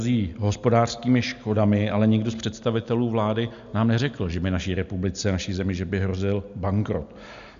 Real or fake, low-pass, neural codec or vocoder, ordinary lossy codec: real; 7.2 kHz; none; MP3, 64 kbps